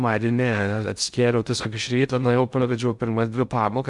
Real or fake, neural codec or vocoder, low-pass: fake; codec, 16 kHz in and 24 kHz out, 0.6 kbps, FocalCodec, streaming, 2048 codes; 10.8 kHz